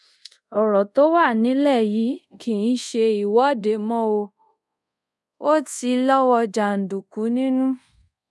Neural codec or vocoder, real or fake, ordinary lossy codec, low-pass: codec, 24 kHz, 0.5 kbps, DualCodec; fake; none; none